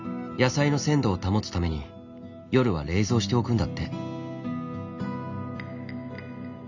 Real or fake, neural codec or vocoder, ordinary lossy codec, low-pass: real; none; none; 7.2 kHz